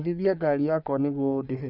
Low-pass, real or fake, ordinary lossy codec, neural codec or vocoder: 5.4 kHz; fake; none; codec, 44.1 kHz, 3.4 kbps, Pupu-Codec